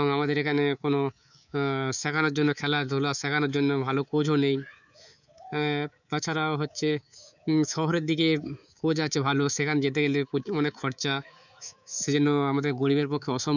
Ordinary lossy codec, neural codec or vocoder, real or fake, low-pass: none; codec, 24 kHz, 3.1 kbps, DualCodec; fake; 7.2 kHz